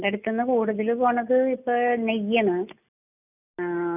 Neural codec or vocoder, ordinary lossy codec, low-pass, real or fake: none; none; 3.6 kHz; real